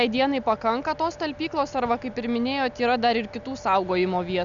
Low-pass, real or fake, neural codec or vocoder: 7.2 kHz; real; none